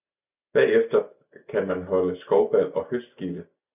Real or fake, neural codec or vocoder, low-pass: real; none; 3.6 kHz